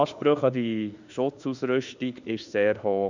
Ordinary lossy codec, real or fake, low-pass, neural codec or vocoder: none; fake; 7.2 kHz; autoencoder, 48 kHz, 32 numbers a frame, DAC-VAE, trained on Japanese speech